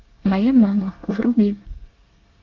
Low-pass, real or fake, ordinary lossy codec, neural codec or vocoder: 7.2 kHz; fake; Opus, 32 kbps; codec, 24 kHz, 1 kbps, SNAC